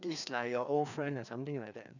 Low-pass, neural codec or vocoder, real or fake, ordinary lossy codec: 7.2 kHz; codec, 16 kHz, 2 kbps, FreqCodec, larger model; fake; none